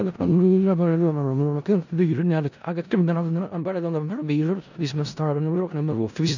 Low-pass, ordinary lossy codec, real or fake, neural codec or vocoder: 7.2 kHz; none; fake; codec, 16 kHz in and 24 kHz out, 0.4 kbps, LongCat-Audio-Codec, four codebook decoder